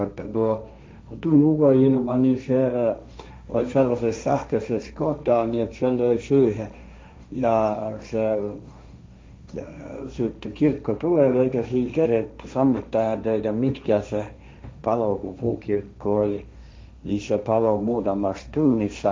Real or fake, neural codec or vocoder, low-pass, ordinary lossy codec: fake; codec, 16 kHz, 1.1 kbps, Voila-Tokenizer; none; none